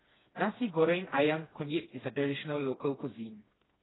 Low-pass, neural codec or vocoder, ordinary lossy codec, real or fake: 7.2 kHz; codec, 16 kHz, 2 kbps, FreqCodec, smaller model; AAC, 16 kbps; fake